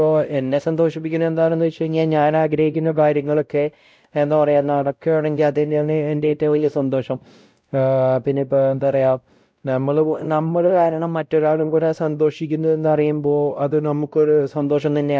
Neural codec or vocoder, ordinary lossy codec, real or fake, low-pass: codec, 16 kHz, 0.5 kbps, X-Codec, WavLM features, trained on Multilingual LibriSpeech; none; fake; none